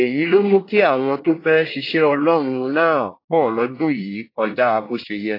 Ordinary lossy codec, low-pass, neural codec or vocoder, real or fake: AAC, 32 kbps; 5.4 kHz; codec, 24 kHz, 1 kbps, SNAC; fake